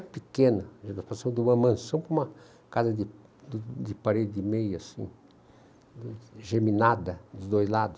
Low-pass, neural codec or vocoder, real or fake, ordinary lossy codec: none; none; real; none